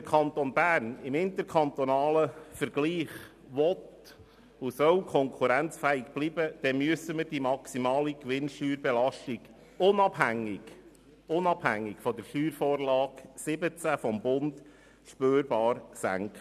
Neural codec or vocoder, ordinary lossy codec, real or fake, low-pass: none; none; real; 14.4 kHz